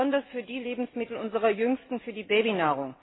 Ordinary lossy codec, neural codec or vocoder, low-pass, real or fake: AAC, 16 kbps; none; 7.2 kHz; real